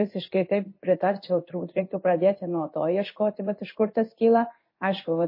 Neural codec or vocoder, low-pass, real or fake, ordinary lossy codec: codec, 16 kHz in and 24 kHz out, 1 kbps, XY-Tokenizer; 5.4 kHz; fake; MP3, 24 kbps